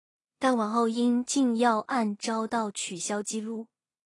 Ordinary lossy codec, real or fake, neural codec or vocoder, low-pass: AAC, 32 kbps; fake; codec, 16 kHz in and 24 kHz out, 0.4 kbps, LongCat-Audio-Codec, two codebook decoder; 10.8 kHz